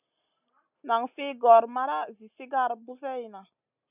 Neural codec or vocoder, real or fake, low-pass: none; real; 3.6 kHz